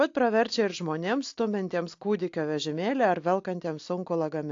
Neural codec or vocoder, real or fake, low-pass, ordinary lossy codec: none; real; 7.2 kHz; AAC, 48 kbps